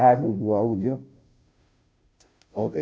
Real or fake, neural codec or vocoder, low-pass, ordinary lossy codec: fake; codec, 16 kHz, 0.5 kbps, FunCodec, trained on Chinese and English, 25 frames a second; none; none